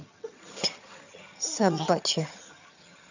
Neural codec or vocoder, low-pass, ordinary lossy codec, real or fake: vocoder, 22.05 kHz, 80 mel bands, HiFi-GAN; 7.2 kHz; none; fake